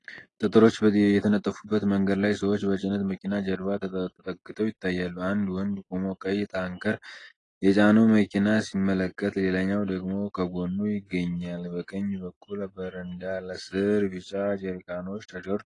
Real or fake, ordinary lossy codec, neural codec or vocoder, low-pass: real; AAC, 32 kbps; none; 10.8 kHz